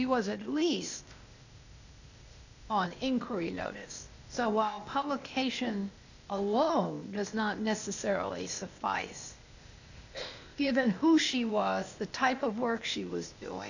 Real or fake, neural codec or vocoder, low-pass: fake; codec, 16 kHz, 0.8 kbps, ZipCodec; 7.2 kHz